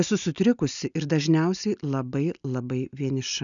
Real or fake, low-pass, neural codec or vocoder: real; 7.2 kHz; none